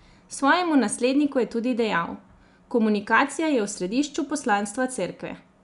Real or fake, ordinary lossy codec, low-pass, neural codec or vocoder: real; none; 10.8 kHz; none